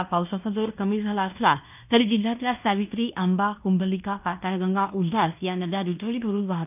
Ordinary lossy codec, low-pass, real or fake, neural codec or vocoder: none; 3.6 kHz; fake; codec, 16 kHz in and 24 kHz out, 0.9 kbps, LongCat-Audio-Codec, fine tuned four codebook decoder